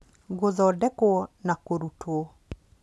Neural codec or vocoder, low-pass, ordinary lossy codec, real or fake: none; none; none; real